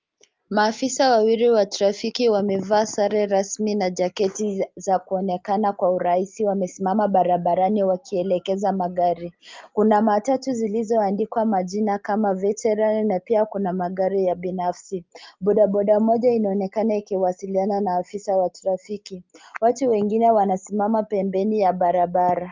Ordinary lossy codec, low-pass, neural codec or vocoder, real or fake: Opus, 32 kbps; 7.2 kHz; none; real